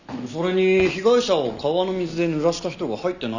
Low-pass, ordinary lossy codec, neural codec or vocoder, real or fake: 7.2 kHz; none; none; real